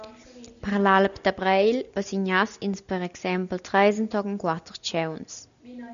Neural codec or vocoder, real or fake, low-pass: none; real; 7.2 kHz